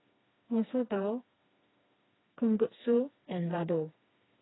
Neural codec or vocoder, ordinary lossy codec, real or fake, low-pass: codec, 16 kHz, 2 kbps, FreqCodec, smaller model; AAC, 16 kbps; fake; 7.2 kHz